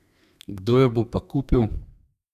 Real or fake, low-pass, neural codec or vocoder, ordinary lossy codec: fake; 14.4 kHz; codec, 32 kHz, 1.9 kbps, SNAC; none